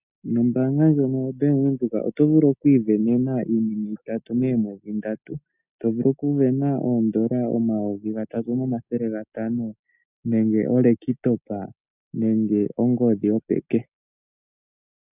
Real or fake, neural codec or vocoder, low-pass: real; none; 3.6 kHz